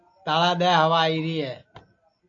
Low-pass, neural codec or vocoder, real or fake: 7.2 kHz; none; real